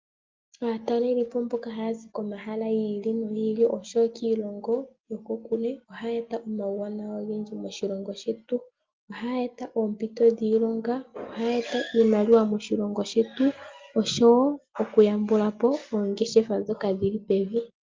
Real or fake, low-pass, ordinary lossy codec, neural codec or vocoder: real; 7.2 kHz; Opus, 32 kbps; none